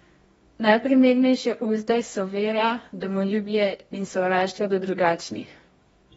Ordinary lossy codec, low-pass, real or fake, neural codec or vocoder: AAC, 24 kbps; 10.8 kHz; fake; codec, 24 kHz, 0.9 kbps, WavTokenizer, medium music audio release